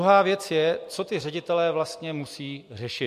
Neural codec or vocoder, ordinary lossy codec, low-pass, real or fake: none; MP3, 64 kbps; 14.4 kHz; real